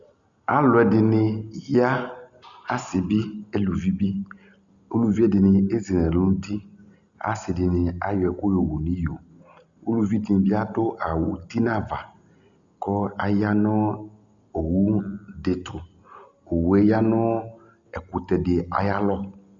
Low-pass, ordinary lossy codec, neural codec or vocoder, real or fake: 7.2 kHz; Opus, 64 kbps; none; real